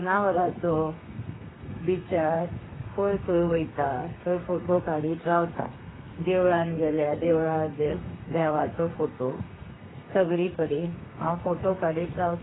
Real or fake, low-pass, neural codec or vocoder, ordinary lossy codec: fake; 7.2 kHz; codec, 32 kHz, 1.9 kbps, SNAC; AAC, 16 kbps